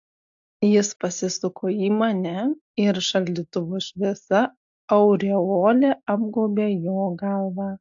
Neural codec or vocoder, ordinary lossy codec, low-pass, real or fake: none; AAC, 64 kbps; 7.2 kHz; real